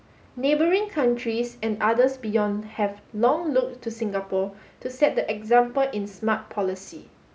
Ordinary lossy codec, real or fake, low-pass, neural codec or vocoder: none; real; none; none